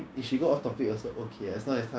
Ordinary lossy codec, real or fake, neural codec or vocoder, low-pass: none; fake; codec, 16 kHz, 6 kbps, DAC; none